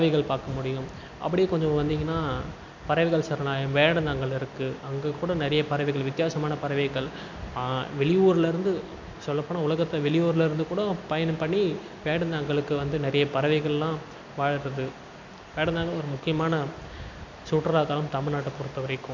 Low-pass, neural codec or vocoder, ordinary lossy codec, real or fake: 7.2 kHz; none; MP3, 64 kbps; real